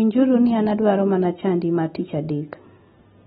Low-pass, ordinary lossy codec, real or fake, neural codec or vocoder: 19.8 kHz; AAC, 16 kbps; real; none